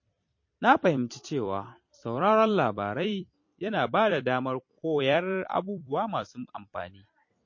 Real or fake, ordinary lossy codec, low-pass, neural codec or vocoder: fake; MP3, 32 kbps; 7.2 kHz; vocoder, 44.1 kHz, 128 mel bands every 256 samples, BigVGAN v2